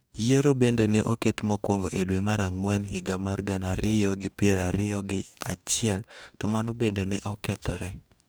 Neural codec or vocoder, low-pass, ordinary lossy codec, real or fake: codec, 44.1 kHz, 2.6 kbps, DAC; none; none; fake